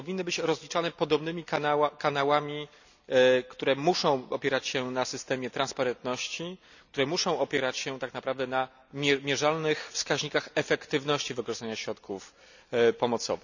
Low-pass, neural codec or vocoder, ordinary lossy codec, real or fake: 7.2 kHz; none; none; real